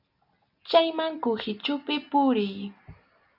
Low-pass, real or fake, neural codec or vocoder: 5.4 kHz; real; none